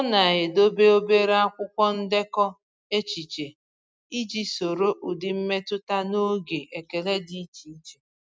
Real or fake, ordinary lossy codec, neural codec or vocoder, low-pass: real; none; none; none